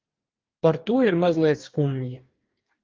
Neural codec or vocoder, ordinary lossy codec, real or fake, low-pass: codec, 44.1 kHz, 2.6 kbps, DAC; Opus, 32 kbps; fake; 7.2 kHz